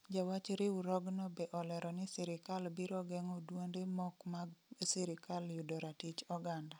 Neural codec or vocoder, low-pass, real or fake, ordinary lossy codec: none; none; real; none